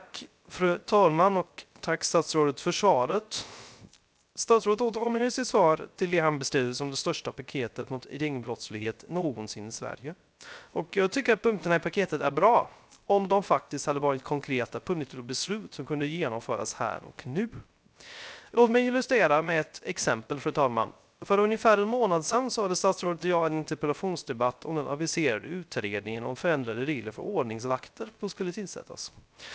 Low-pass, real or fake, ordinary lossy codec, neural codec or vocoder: none; fake; none; codec, 16 kHz, 0.3 kbps, FocalCodec